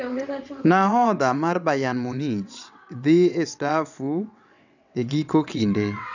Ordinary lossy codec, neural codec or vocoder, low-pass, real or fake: none; vocoder, 22.05 kHz, 80 mel bands, WaveNeXt; 7.2 kHz; fake